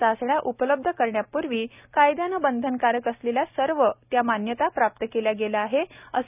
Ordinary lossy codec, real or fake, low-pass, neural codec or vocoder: none; real; 3.6 kHz; none